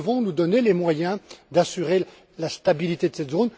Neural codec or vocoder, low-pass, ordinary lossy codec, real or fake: none; none; none; real